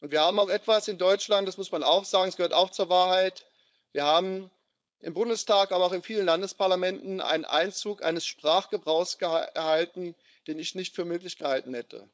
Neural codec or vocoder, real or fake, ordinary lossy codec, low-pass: codec, 16 kHz, 4.8 kbps, FACodec; fake; none; none